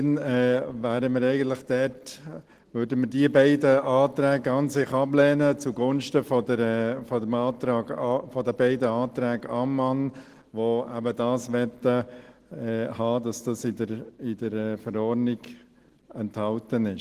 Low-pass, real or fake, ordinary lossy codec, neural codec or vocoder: 14.4 kHz; real; Opus, 16 kbps; none